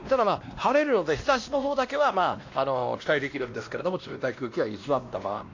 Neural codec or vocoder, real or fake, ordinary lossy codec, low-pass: codec, 16 kHz, 1 kbps, X-Codec, WavLM features, trained on Multilingual LibriSpeech; fake; AAC, 48 kbps; 7.2 kHz